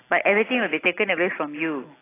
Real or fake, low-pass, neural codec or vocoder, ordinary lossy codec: real; 3.6 kHz; none; AAC, 16 kbps